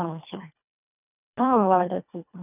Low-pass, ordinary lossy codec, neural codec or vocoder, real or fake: 3.6 kHz; none; codec, 24 kHz, 1.5 kbps, HILCodec; fake